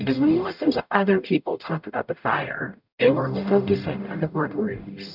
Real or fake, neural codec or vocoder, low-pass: fake; codec, 44.1 kHz, 0.9 kbps, DAC; 5.4 kHz